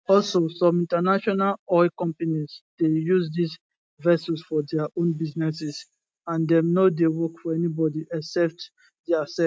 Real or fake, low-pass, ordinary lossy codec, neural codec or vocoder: real; none; none; none